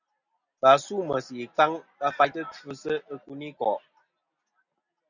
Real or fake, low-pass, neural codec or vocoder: real; 7.2 kHz; none